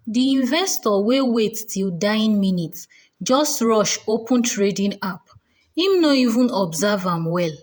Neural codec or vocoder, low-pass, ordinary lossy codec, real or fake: vocoder, 48 kHz, 128 mel bands, Vocos; none; none; fake